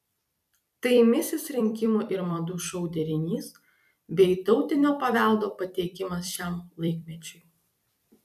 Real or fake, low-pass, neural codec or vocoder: fake; 14.4 kHz; vocoder, 44.1 kHz, 128 mel bands every 256 samples, BigVGAN v2